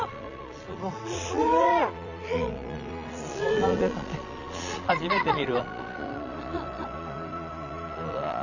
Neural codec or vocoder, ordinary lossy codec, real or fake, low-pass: vocoder, 22.05 kHz, 80 mel bands, Vocos; none; fake; 7.2 kHz